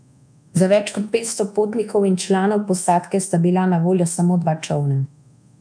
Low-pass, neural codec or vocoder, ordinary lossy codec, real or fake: 9.9 kHz; codec, 24 kHz, 1.2 kbps, DualCodec; none; fake